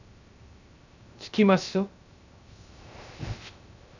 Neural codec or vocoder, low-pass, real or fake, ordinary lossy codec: codec, 16 kHz, 0.3 kbps, FocalCodec; 7.2 kHz; fake; none